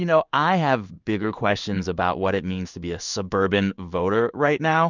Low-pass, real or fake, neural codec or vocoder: 7.2 kHz; fake; codec, 16 kHz in and 24 kHz out, 1 kbps, XY-Tokenizer